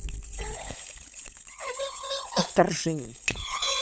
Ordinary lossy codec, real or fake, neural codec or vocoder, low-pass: none; fake; codec, 16 kHz, 16 kbps, FunCodec, trained on LibriTTS, 50 frames a second; none